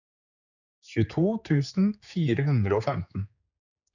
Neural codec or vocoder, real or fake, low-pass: codec, 16 kHz, 4 kbps, X-Codec, HuBERT features, trained on general audio; fake; 7.2 kHz